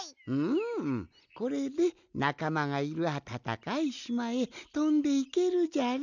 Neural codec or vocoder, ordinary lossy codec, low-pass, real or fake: none; none; 7.2 kHz; real